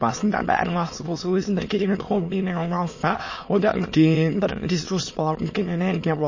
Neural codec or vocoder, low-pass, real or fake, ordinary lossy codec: autoencoder, 22.05 kHz, a latent of 192 numbers a frame, VITS, trained on many speakers; 7.2 kHz; fake; MP3, 32 kbps